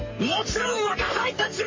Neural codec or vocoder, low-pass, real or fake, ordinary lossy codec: codec, 44.1 kHz, 3.4 kbps, Pupu-Codec; 7.2 kHz; fake; MP3, 32 kbps